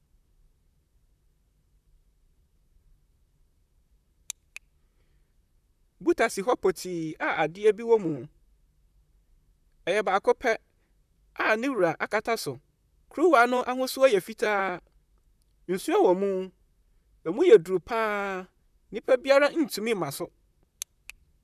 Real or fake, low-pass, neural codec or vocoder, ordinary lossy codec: fake; 14.4 kHz; vocoder, 44.1 kHz, 128 mel bands, Pupu-Vocoder; none